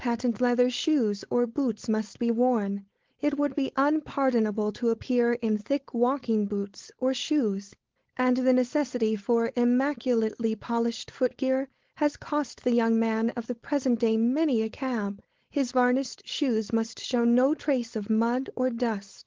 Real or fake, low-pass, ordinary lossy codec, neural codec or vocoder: fake; 7.2 kHz; Opus, 16 kbps; codec, 16 kHz, 4.8 kbps, FACodec